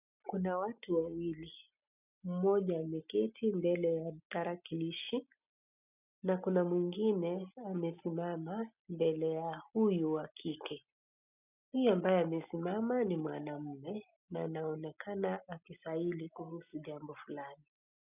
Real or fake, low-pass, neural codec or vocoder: real; 3.6 kHz; none